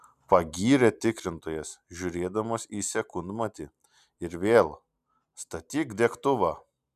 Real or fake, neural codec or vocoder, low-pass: real; none; 14.4 kHz